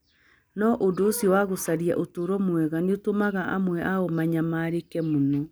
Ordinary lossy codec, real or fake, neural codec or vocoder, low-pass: none; real; none; none